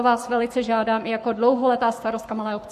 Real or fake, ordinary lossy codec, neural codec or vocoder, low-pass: fake; MP3, 64 kbps; codec, 44.1 kHz, 7.8 kbps, Pupu-Codec; 14.4 kHz